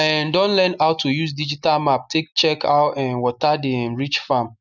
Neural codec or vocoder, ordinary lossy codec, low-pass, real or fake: none; none; 7.2 kHz; real